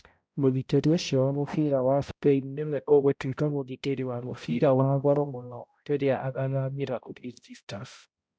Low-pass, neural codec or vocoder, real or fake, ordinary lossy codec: none; codec, 16 kHz, 0.5 kbps, X-Codec, HuBERT features, trained on balanced general audio; fake; none